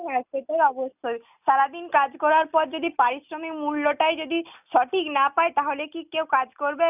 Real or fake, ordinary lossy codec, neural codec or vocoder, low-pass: real; none; none; 3.6 kHz